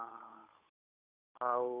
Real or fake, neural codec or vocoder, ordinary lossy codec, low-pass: fake; codec, 16 kHz, 8 kbps, FunCodec, trained on Chinese and English, 25 frames a second; none; 3.6 kHz